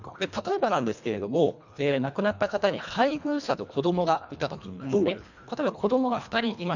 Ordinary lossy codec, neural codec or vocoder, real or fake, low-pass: none; codec, 24 kHz, 1.5 kbps, HILCodec; fake; 7.2 kHz